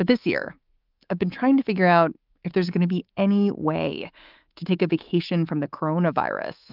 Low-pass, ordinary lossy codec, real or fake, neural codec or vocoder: 5.4 kHz; Opus, 32 kbps; fake; codec, 24 kHz, 3.1 kbps, DualCodec